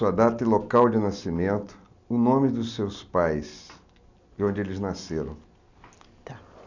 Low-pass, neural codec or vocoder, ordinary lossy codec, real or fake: 7.2 kHz; none; none; real